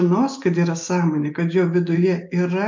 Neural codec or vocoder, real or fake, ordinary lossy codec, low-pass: none; real; MP3, 64 kbps; 7.2 kHz